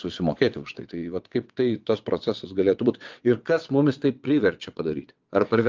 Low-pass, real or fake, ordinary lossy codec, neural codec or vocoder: 7.2 kHz; fake; Opus, 32 kbps; vocoder, 22.05 kHz, 80 mel bands, WaveNeXt